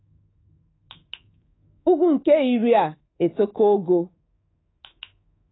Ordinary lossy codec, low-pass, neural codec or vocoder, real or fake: AAC, 16 kbps; 7.2 kHz; codec, 24 kHz, 3.1 kbps, DualCodec; fake